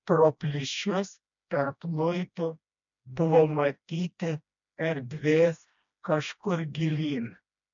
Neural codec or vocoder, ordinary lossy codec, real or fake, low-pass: codec, 16 kHz, 1 kbps, FreqCodec, smaller model; MP3, 64 kbps; fake; 7.2 kHz